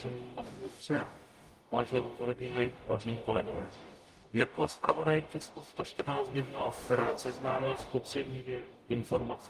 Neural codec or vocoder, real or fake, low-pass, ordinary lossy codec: codec, 44.1 kHz, 0.9 kbps, DAC; fake; 14.4 kHz; Opus, 32 kbps